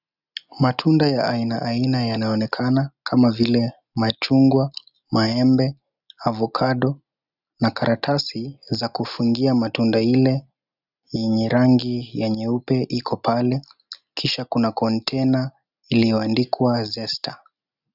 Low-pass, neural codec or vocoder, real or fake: 5.4 kHz; none; real